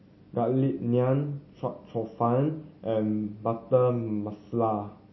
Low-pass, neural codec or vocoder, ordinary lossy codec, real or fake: 7.2 kHz; none; MP3, 24 kbps; real